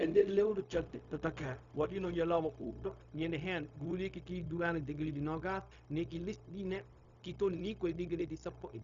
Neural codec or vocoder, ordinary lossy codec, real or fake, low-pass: codec, 16 kHz, 0.4 kbps, LongCat-Audio-Codec; none; fake; 7.2 kHz